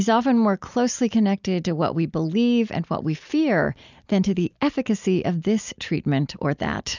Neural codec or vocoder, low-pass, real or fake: none; 7.2 kHz; real